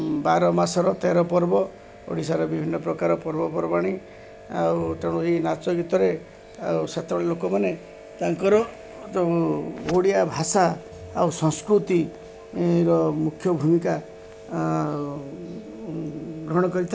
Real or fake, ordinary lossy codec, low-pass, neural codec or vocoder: real; none; none; none